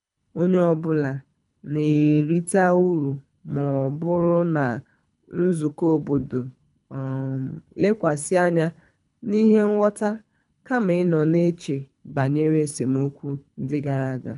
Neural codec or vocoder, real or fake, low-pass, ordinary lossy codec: codec, 24 kHz, 3 kbps, HILCodec; fake; 10.8 kHz; none